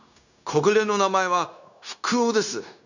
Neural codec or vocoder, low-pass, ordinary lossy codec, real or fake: codec, 16 kHz, 0.9 kbps, LongCat-Audio-Codec; 7.2 kHz; none; fake